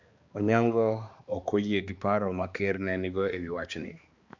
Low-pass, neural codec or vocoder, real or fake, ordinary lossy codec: 7.2 kHz; codec, 16 kHz, 2 kbps, X-Codec, HuBERT features, trained on balanced general audio; fake; Opus, 64 kbps